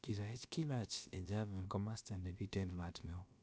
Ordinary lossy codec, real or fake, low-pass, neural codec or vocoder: none; fake; none; codec, 16 kHz, about 1 kbps, DyCAST, with the encoder's durations